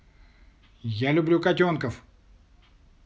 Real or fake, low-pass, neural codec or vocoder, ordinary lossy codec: real; none; none; none